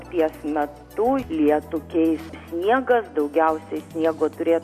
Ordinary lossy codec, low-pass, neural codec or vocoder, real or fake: MP3, 64 kbps; 14.4 kHz; none; real